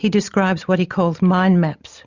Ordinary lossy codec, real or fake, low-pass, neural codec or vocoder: Opus, 64 kbps; real; 7.2 kHz; none